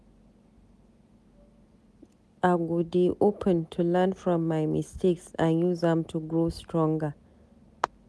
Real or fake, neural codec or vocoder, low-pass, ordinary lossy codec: real; none; none; none